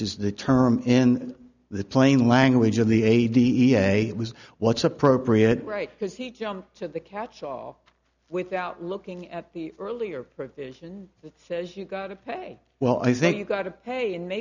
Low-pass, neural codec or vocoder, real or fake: 7.2 kHz; none; real